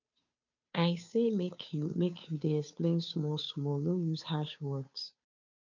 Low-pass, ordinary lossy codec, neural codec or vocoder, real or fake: 7.2 kHz; none; codec, 16 kHz, 2 kbps, FunCodec, trained on Chinese and English, 25 frames a second; fake